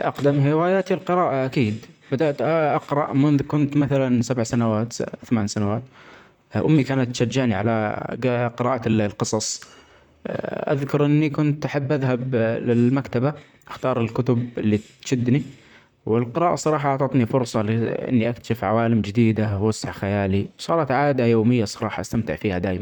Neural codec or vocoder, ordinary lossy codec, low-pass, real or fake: vocoder, 44.1 kHz, 128 mel bands, Pupu-Vocoder; none; 19.8 kHz; fake